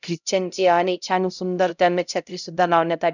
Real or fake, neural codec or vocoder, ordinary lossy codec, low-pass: fake; codec, 16 kHz, 0.5 kbps, X-Codec, HuBERT features, trained on LibriSpeech; none; 7.2 kHz